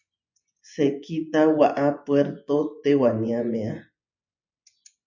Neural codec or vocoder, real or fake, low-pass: vocoder, 24 kHz, 100 mel bands, Vocos; fake; 7.2 kHz